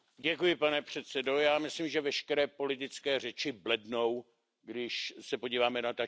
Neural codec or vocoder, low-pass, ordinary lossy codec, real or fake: none; none; none; real